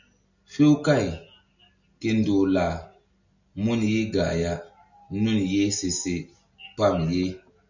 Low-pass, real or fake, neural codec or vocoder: 7.2 kHz; real; none